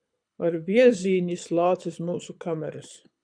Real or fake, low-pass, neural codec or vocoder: fake; 9.9 kHz; codec, 24 kHz, 6 kbps, HILCodec